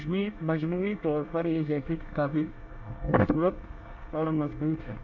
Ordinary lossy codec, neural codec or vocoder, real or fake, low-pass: none; codec, 24 kHz, 1 kbps, SNAC; fake; 7.2 kHz